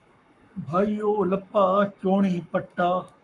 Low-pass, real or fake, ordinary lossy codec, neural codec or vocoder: 10.8 kHz; fake; MP3, 96 kbps; codec, 44.1 kHz, 7.8 kbps, Pupu-Codec